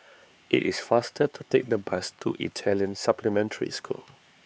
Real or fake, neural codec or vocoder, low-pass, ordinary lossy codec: fake; codec, 16 kHz, 4 kbps, X-Codec, HuBERT features, trained on LibriSpeech; none; none